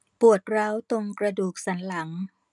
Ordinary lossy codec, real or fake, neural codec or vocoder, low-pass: none; real; none; 10.8 kHz